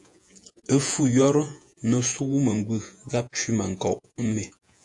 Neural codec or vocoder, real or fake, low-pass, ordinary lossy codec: vocoder, 48 kHz, 128 mel bands, Vocos; fake; 10.8 kHz; AAC, 64 kbps